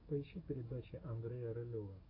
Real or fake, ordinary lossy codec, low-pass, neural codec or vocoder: fake; AAC, 48 kbps; 5.4 kHz; codec, 44.1 kHz, 7.8 kbps, DAC